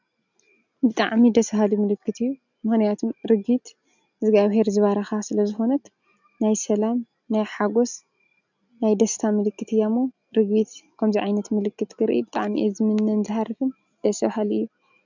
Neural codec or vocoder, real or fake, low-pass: none; real; 7.2 kHz